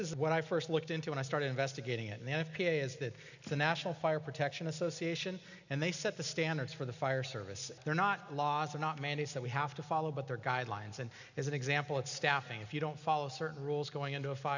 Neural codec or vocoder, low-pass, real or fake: none; 7.2 kHz; real